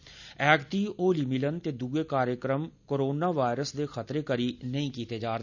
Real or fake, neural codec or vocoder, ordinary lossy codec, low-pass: real; none; none; 7.2 kHz